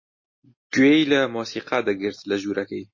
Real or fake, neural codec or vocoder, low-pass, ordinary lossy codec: real; none; 7.2 kHz; MP3, 32 kbps